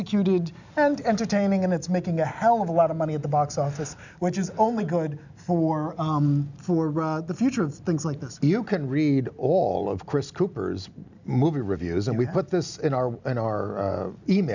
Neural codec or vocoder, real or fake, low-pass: none; real; 7.2 kHz